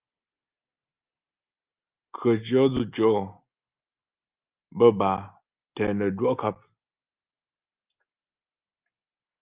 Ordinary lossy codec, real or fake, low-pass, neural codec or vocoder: Opus, 32 kbps; real; 3.6 kHz; none